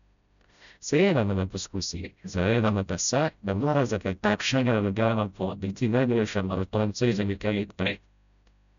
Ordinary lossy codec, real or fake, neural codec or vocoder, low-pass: none; fake; codec, 16 kHz, 0.5 kbps, FreqCodec, smaller model; 7.2 kHz